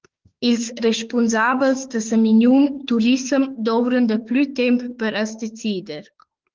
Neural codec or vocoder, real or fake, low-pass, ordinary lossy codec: autoencoder, 48 kHz, 32 numbers a frame, DAC-VAE, trained on Japanese speech; fake; 7.2 kHz; Opus, 16 kbps